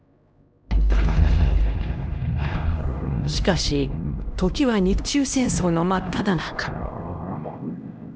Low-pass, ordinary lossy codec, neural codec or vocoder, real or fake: none; none; codec, 16 kHz, 1 kbps, X-Codec, HuBERT features, trained on LibriSpeech; fake